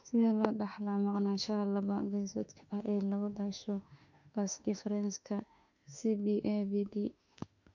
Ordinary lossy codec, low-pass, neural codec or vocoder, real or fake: none; 7.2 kHz; autoencoder, 48 kHz, 32 numbers a frame, DAC-VAE, trained on Japanese speech; fake